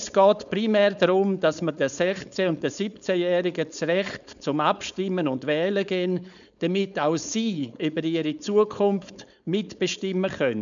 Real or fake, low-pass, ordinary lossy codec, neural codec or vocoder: fake; 7.2 kHz; none; codec, 16 kHz, 4.8 kbps, FACodec